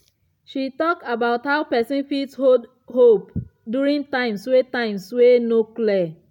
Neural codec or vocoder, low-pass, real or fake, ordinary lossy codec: none; 19.8 kHz; real; none